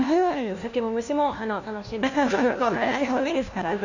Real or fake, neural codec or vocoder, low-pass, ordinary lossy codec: fake; codec, 16 kHz, 1 kbps, FunCodec, trained on LibriTTS, 50 frames a second; 7.2 kHz; none